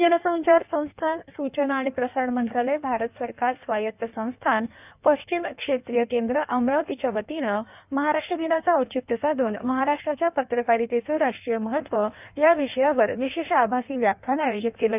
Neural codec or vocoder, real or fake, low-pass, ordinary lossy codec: codec, 16 kHz in and 24 kHz out, 1.1 kbps, FireRedTTS-2 codec; fake; 3.6 kHz; none